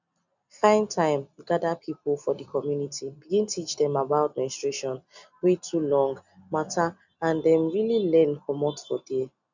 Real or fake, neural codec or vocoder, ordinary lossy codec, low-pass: real; none; none; 7.2 kHz